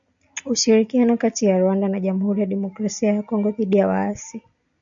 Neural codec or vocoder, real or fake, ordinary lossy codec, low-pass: none; real; MP3, 96 kbps; 7.2 kHz